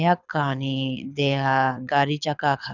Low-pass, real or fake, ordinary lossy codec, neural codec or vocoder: 7.2 kHz; fake; none; codec, 24 kHz, 6 kbps, HILCodec